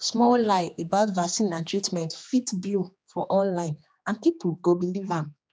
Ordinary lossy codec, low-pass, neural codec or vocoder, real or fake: none; none; codec, 16 kHz, 2 kbps, X-Codec, HuBERT features, trained on general audio; fake